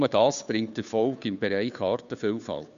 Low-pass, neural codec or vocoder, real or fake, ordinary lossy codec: 7.2 kHz; codec, 16 kHz, 2 kbps, FunCodec, trained on Chinese and English, 25 frames a second; fake; none